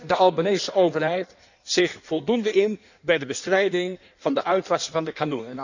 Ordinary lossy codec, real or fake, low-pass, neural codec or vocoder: none; fake; 7.2 kHz; codec, 16 kHz in and 24 kHz out, 1.1 kbps, FireRedTTS-2 codec